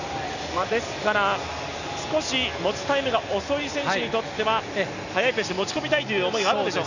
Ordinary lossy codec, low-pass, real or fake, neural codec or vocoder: none; 7.2 kHz; real; none